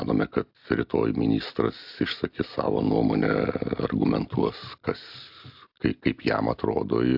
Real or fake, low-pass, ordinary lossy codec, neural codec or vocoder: real; 5.4 kHz; AAC, 48 kbps; none